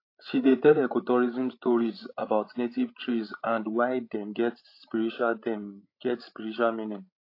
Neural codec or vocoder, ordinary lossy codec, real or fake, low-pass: codec, 16 kHz, 16 kbps, FreqCodec, larger model; AAC, 32 kbps; fake; 5.4 kHz